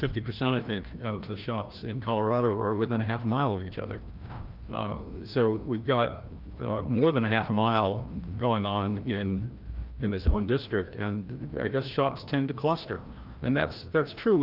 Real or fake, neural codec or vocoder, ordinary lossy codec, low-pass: fake; codec, 16 kHz, 1 kbps, FreqCodec, larger model; Opus, 24 kbps; 5.4 kHz